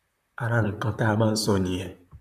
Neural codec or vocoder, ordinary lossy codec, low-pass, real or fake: vocoder, 44.1 kHz, 128 mel bands, Pupu-Vocoder; none; 14.4 kHz; fake